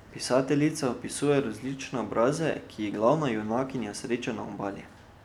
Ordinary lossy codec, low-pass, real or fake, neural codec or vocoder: none; 19.8 kHz; real; none